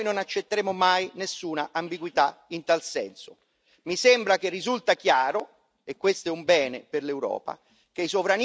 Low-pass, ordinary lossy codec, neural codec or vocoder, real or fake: none; none; none; real